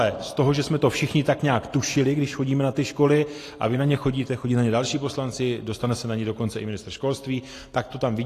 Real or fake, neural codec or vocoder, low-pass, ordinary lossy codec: real; none; 14.4 kHz; AAC, 48 kbps